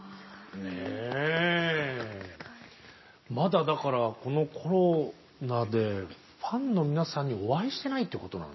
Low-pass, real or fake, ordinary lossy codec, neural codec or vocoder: 7.2 kHz; real; MP3, 24 kbps; none